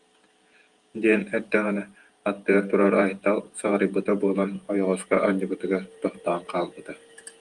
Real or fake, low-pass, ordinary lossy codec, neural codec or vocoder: fake; 10.8 kHz; Opus, 32 kbps; vocoder, 48 kHz, 128 mel bands, Vocos